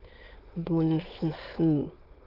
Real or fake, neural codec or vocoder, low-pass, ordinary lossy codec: fake; autoencoder, 22.05 kHz, a latent of 192 numbers a frame, VITS, trained on many speakers; 5.4 kHz; Opus, 16 kbps